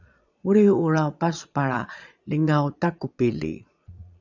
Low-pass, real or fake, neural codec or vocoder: 7.2 kHz; fake; vocoder, 22.05 kHz, 80 mel bands, Vocos